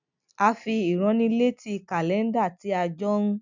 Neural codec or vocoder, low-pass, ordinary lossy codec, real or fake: none; 7.2 kHz; none; real